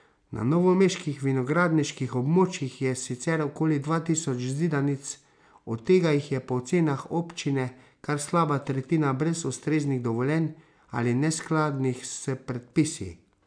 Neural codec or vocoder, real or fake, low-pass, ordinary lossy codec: none; real; 9.9 kHz; none